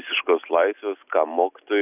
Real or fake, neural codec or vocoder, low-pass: real; none; 3.6 kHz